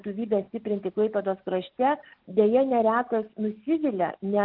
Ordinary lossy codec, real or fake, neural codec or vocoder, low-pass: Opus, 24 kbps; real; none; 5.4 kHz